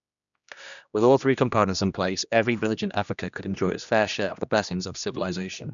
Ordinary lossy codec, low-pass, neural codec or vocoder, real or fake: none; 7.2 kHz; codec, 16 kHz, 1 kbps, X-Codec, HuBERT features, trained on general audio; fake